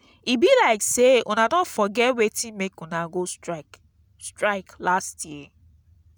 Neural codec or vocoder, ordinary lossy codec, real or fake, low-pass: none; none; real; none